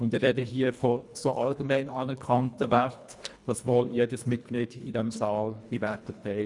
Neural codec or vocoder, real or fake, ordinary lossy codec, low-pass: codec, 24 kHz, 1.5 kbps, HILCodec; fake; none; 10.8 kHz